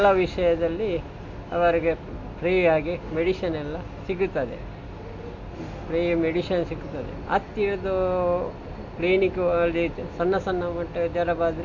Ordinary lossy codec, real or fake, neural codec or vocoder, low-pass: MP3, 48 kbps; real; none; 7.2 kHz